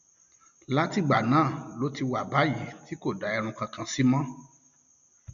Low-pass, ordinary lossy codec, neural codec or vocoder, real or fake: 7.2 kHz; MP3, 64 kbps; none; real